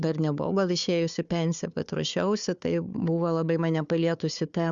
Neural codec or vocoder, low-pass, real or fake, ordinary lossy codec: codec, 16 kHz, 4 kbps, FunCodec, trained on Chinese and English, 50 frames a second; 7.2 kHz; fake; Opus, 64 kbps